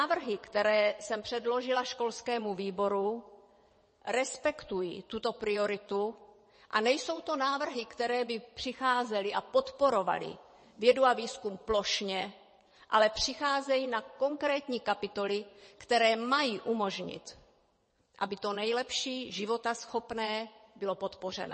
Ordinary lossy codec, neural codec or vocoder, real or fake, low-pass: MP3, 32 kbps; vocoder, 22.05 kHz, 80 mel bands, WaveNeXt; fake; 9.9 kHz